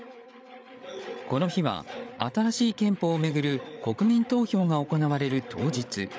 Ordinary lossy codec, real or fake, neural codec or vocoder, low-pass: none; fake; codec, 16 kHz, 8 kbps, FreqCodec, larger model; none